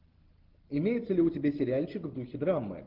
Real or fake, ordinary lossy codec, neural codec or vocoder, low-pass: real; Opus, 16 kbps; none; 5.4 kHz